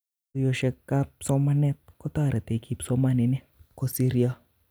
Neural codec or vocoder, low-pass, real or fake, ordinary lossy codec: vocoder, 44.1 kHz, 128 mel bands every 256 samples, BigVGAN v2; none; fake; none